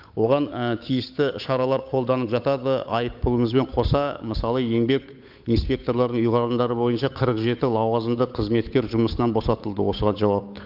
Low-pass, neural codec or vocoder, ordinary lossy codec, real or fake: 5.4 kHz; codec, 16 kHz, 8 kbps, FunCodec, trained on Chinese and English, 25 frames a second; AAC, 48 kbps; fake